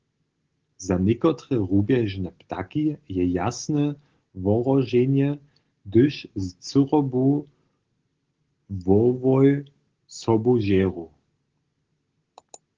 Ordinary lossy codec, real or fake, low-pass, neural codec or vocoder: Opus, 16 kbps; real; 7.2 kHz; none